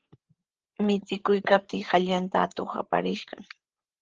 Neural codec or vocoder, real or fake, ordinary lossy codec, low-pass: codec, 16 kHz, 8 kbps, FreqCodec, larger model; fake; Opus, 16 kbps; 7.2 kHz